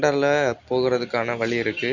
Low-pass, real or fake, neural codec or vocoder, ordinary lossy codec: 7.2 kHz; real; none; none